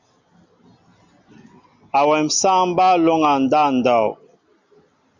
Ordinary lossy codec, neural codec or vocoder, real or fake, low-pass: Opus, 64 kbps; none; real; 7.2 kHz